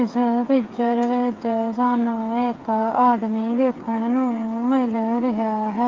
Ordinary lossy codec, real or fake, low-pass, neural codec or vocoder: Opus, 24 kbps; fake; 7.2 kHz; codec, 16 kHz, 8 kbps, FreqCodec, smaller model